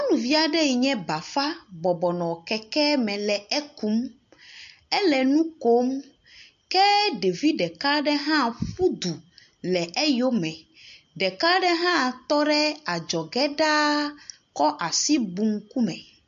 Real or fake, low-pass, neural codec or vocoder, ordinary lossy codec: real; 7.2 kHz; none; MP3, 48 kbps